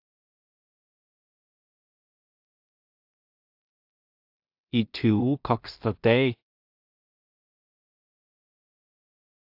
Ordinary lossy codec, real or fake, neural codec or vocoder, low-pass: AAC, 48 kbps; fake; codec, 16 kHz in and 24 kHz out, 0.4 kbps, LongCat-Audio-Codec, two codebook decoder; 5.4 kHz